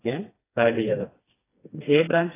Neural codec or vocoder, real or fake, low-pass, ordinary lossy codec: codec, 16 kHz, 2 kbps, FreqCodec, smaller model; fake; 3.6 kHz; AAC, 16 kbps